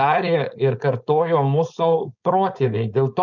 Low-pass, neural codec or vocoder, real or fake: 7.2 kHz; codec, 16 kHz, 4.8 kbps, FACodec; fake